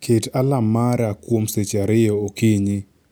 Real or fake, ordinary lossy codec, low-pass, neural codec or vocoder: real; none; none; none